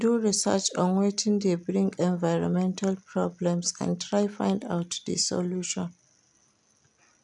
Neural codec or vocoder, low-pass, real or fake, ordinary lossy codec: none; 10.8 kHz; real; none